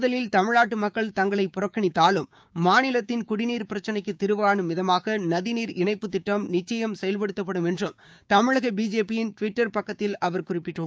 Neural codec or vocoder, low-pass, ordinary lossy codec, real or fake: codec, 16 kHz, 6 kbps, DAC; none; none; fake